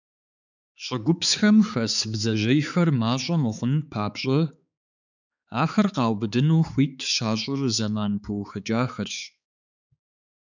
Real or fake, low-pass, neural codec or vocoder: fake; 7.2 kHz; codec, 16 kHz, 4 kbps, X-Codec, HuBERT features, trained on balanced general audio